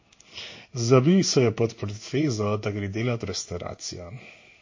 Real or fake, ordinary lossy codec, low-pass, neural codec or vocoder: fake; MP3, 32 kbps; 7.2 kHz; codec, 16 kHz in and 24 kHz out, 1 kbps, XY-Tokenizer